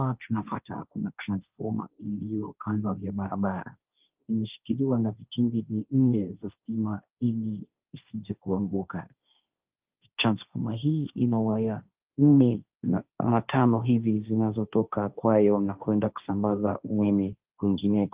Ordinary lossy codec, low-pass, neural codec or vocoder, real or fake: Opus, 24 kbps; 3.6 kHz; codec, 16 kHz, 1.1 kbps, Voila-Tokenizer; fake